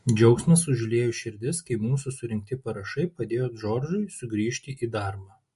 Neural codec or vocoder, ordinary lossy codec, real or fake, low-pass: none; MP3, 48 kbps; real; 14.4 kHz